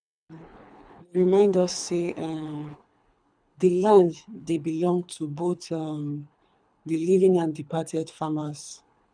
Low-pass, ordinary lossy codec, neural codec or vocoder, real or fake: 9.9 kHz; none; codec, 24 kHz, 3 kbps, HILCodec; fake